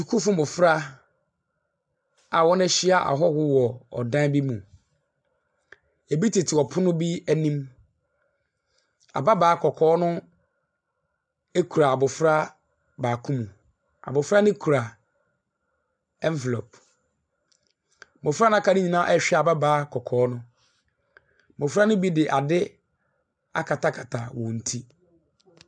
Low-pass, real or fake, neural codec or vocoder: 9.9 kHz; real; none